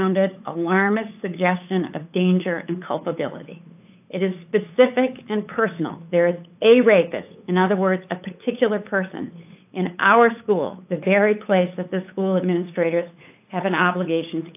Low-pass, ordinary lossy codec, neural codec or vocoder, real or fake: 3.6 kHz; AAC, 32 kbps; codec, 16 kHz, 8 kbps, FunCodec, trained on LibriTTS, 25 frames a second; fake